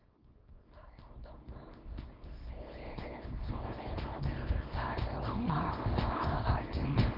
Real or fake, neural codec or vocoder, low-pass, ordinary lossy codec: fake; codec, 24 kHz, 0.9 kbps, WavTokenizer, small release; 5.4 kHz; Opus, 24 kbps